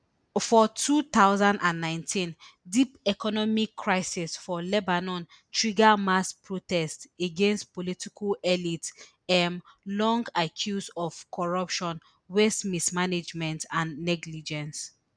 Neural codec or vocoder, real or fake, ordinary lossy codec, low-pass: none; real; none; 9.9 kHz